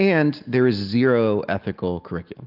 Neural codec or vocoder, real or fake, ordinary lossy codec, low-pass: none; real; Opus, 32 kbps; 5.4 kHz